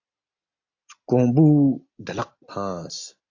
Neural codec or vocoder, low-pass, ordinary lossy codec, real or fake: none; 7.2 kHz; AAC, 48 kbps; real